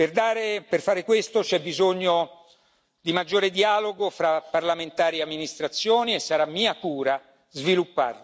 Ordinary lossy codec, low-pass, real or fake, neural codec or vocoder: none; none; real; none